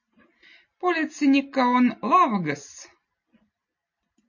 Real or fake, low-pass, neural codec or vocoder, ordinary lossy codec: real; 7.2 kHz; none; MP3, 32 kbps